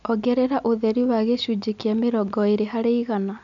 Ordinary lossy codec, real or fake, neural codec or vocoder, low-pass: none; real; none; 7.2 kHz